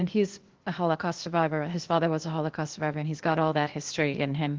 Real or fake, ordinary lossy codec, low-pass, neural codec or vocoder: fake; Opus, 16 kbps; 7.2 kHz; codec, 16 kHz, 0.8 kbps, ZipCodec